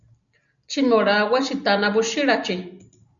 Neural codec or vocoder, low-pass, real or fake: none; 7.2 kHz; real